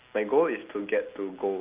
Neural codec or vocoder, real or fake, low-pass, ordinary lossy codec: none; real; 3.6 kHz; Opus, 24 kbps